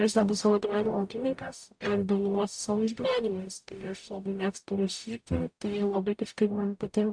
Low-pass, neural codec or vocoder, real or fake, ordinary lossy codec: 9.9 kHz; codec, 44.1 kHz, 0.9 kbps, DAC; fake; AAC, 64 kbps